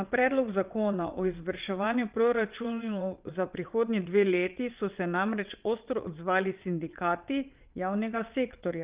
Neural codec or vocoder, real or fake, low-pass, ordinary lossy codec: vocoder, 22.05 kHz, 80 mel bands, WaveNeXt; fake; 3.6 kHz; Opus, 24 kbps